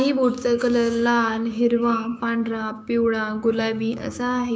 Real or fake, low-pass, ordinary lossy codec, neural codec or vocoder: fake; none; none; codec, 16 kHz, 6 kbps, DAC